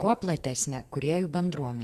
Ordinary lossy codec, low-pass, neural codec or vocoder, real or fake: Opus, 64 kbps; 14.4 kHz; codec, 44.1 kHz, 2.6 kbps, SNAC; fake